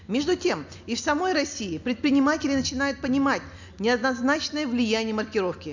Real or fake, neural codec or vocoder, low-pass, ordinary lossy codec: real; none; 7.2 kHz; none